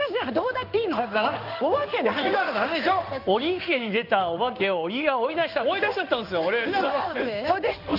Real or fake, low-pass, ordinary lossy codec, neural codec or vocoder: fake; 5.4 kHz; none; codec, 16 kHz in and 24 kHz out, 1 kbps, XY-Tokenizer